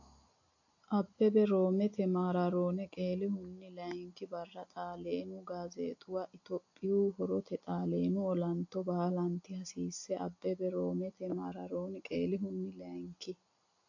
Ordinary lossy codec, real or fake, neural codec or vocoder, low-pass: AAC, 48 kbps; real; none; 7.2 kHz